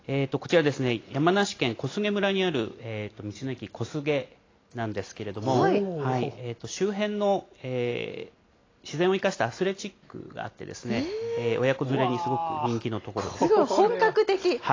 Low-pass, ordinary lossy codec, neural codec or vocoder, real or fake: 7.2 kHz; AAC, 32 kbps; none; real